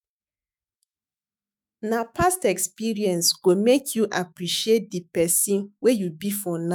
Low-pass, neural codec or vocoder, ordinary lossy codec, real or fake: none; autoencoder, 48 kHz, 128 numbers a frame, DAC-VAE, trained on Japanese speech; none; fake